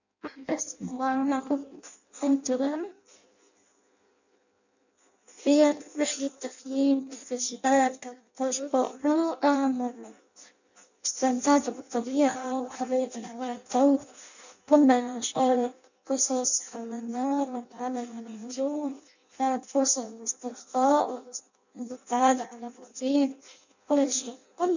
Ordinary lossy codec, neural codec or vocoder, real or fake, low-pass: none; codec, 16 kHz in and 24 kHz out, 0.6 kbps, FireRedTTS-2 codec; fake; 7.2 kHz